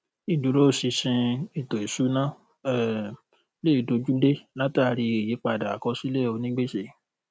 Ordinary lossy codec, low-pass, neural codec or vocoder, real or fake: none; none; none; real